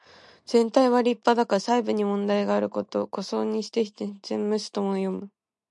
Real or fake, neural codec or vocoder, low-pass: real; none; 10.8 kHz